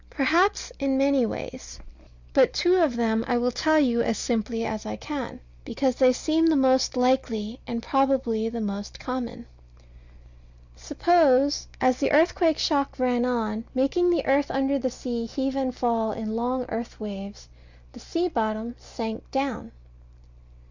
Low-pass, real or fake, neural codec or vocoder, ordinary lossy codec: 7.2 kHz; real; none; Opus, 64 kbps